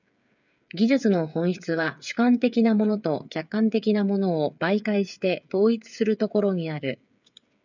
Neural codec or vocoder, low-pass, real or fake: codec, 16 kHz, 8 kbps, FreqCodec, smaller model; 7.2 kHz; fake